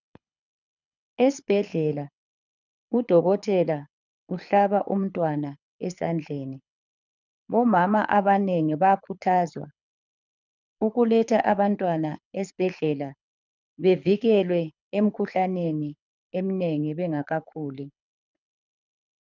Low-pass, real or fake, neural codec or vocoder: 7.2 kHz; fake; codec, 24 kHz, 6 kbps, HILCodec